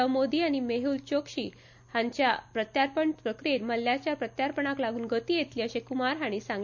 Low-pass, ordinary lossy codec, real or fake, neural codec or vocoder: 7.2 kHz; none; real; none